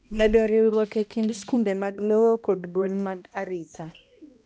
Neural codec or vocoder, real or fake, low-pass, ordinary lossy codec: codec, 16 kHz, 1 kbps, X-Codec, HuBERT features, trained on balanced general audio; fake; none; none